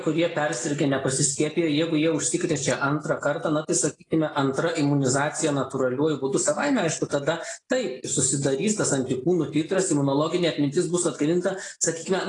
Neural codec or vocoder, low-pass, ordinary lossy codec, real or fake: none; 10.8 kHz; AAC, 32 kbps; real